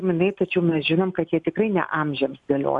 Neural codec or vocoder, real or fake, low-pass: none; real; 10.8 kHz